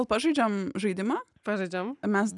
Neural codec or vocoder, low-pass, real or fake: none; 10.8 kHz; real